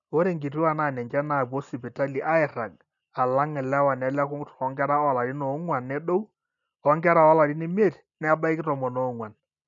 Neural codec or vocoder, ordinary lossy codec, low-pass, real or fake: none; none; 7.2 kHz; real